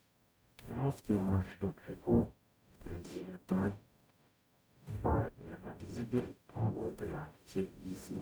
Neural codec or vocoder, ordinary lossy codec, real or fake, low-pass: codec, 44.1 kHz, 0.9 kbps, DAC; none; fake; none